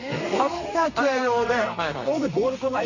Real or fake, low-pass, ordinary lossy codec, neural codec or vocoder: fake; 7.2 kHz; none; codec, 32 kHz, 1.9 kbps, SNAC